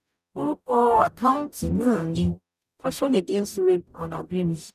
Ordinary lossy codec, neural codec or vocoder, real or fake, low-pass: none; codec, 44.1 kHz, 0.9 kbps, DAC; fake; 14.4 kHz